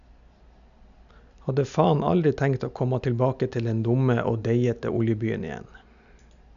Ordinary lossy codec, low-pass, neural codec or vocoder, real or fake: none; 7.2 kHz; none; real